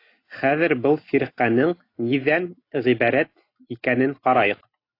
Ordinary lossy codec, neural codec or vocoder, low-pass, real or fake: AAC, 32 kbps; none; 5.4 kHz; real